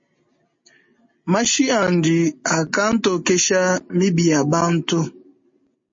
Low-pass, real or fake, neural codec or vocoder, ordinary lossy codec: 7.2 kHz; real; none; MP3, 32 kbps